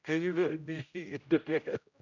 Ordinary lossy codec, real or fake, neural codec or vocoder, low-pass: none; fake; codec, 16 kHz, 0.5 kbps, X-Codec, HuBERT features, trained on general audio; 7.2 kHz